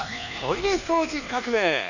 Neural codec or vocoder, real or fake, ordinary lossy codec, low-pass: codec, 24 kHz, 1.2 kbps, DualCodec; fake; none; 7.2 kHz